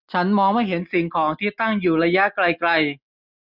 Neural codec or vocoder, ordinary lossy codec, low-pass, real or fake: none; none; 5.4 kHz; real